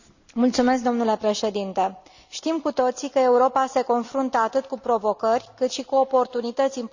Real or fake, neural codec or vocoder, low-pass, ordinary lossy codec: real; none; 7.2 kHz; none